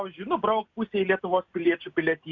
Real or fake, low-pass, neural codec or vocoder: real; 7.2 kHz; none